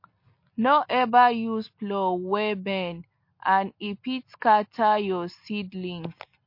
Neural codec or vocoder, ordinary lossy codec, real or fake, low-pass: none; MP3, 32 kbps; real; 5.4 kHz